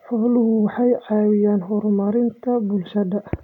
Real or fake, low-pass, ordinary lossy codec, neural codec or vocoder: real; 19.8 kHz; none; none